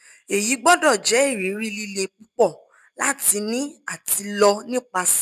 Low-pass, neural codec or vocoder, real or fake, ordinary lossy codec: 14.4 kHz; none; real; none